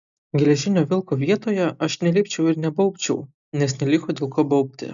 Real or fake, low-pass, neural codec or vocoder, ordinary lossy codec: real; 7.2 kHz; none; MP3, 96 kbps